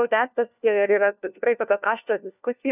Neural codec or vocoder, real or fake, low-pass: codec, 16 kHz, 1 kbps, FunCodec, trained on LibriTTS, 50 frames a second; fake; 3.6 kHz